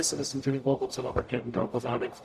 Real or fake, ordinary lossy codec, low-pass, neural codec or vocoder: fake; AAC, 96 kbps; 14.4 kHz; codec, 44.1 kHz, 0.9 kbps, DAC